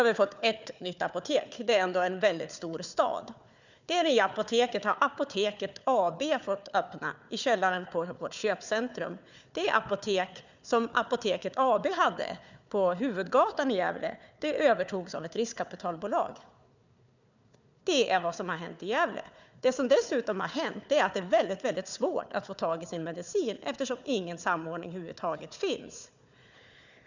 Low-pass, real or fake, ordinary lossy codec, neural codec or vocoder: 7.2 kHz; fake; none; codec, 16 kHz, 4 kbps, FunCodec, trained on Chinese and English, 50 frames a second